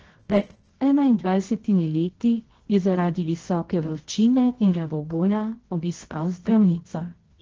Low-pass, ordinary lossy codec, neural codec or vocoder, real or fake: 7.2 kHz; Opus, 16 kbps; codec, 24 kHz, 0.9 kbps, WavTokenizer, medium music audio release; fake